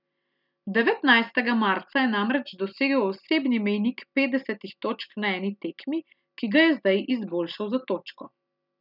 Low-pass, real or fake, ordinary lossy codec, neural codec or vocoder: 5.4 kHz; real; none; none